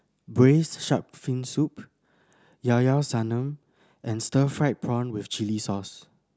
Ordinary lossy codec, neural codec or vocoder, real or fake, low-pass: none; none; real; none